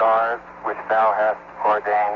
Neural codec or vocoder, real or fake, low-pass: none; real; 7.2 kHz